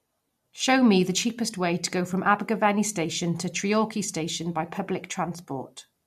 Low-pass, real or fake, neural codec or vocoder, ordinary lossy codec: 14.4 kHz; real; none; MP3, 64 kbps